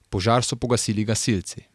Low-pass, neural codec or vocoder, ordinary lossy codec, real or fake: none; none; none; real